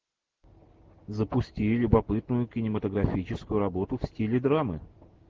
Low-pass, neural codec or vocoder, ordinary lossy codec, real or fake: 7.2 kHz; none; Opus, 16 kbps; real